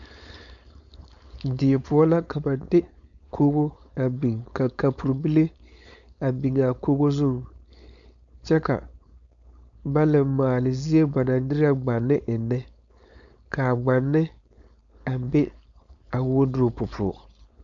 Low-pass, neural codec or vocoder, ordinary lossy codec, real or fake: 7.2 kHz; codec, 16 kHz, 4.8 kbps, FACodec; MP3, 96 kbps; fake